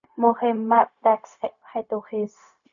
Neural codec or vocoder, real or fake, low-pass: codec, 16 kHz, 0.4 kbps, LongCat-Audio-Codec; fake; 7.2 kHz